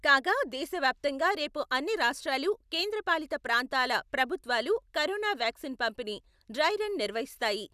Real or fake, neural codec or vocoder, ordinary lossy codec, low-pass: real; none; none; 14.4 kHz